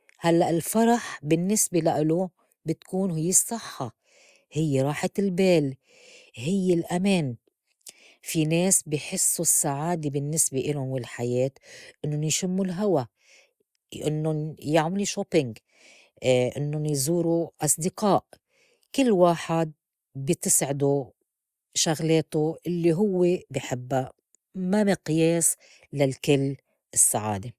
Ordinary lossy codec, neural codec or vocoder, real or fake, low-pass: Opus, 64 kbps; none; real; 14.4 kHz